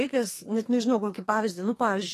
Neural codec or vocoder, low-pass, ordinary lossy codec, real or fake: codec, 32 kHz, 1.9 kbps, SNAC; 14.4 kHz; AAC, 48 kbps; fake